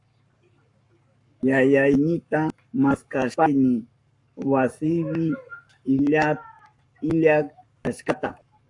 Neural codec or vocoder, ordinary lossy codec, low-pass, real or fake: codec, 44.1 kHz, 7.8 kbps, Pupu-Codec; AAC, 64 kbps; 10.8 kHz; fake